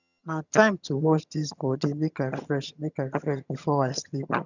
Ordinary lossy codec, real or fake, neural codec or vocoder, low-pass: none; fake; vocoder, 22.05 kHz, 80 mel bands, HiFi-GAN; 7.2 kHz